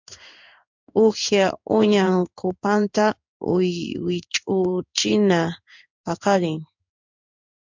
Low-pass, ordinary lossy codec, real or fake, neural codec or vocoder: 7.2 kHz; MP3, 64 kbps; fake; codec, 16 kHz in and 24 kHz out, 1 kbps, XY-Tokenizer